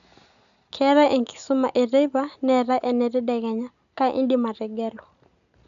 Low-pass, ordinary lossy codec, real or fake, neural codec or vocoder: 7.2 kHz; none; real; none